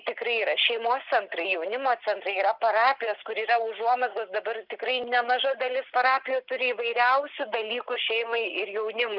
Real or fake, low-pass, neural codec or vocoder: real; 5.4 kHz; none